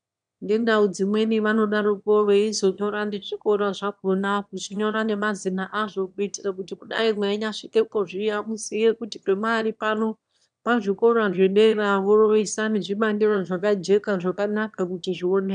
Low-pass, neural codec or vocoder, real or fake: 9.9 kHz; autoencoder, 22.05 kHz, a latent of 192 numbers a frame, VITS, trained on one speaker; fake